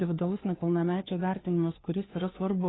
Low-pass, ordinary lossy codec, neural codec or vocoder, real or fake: 7.2 kHz; AAC, 16 kbps; codec, 16 kHz, 2 kbps, FunCodec, trained on Chinese and English, 25 frames a second; fake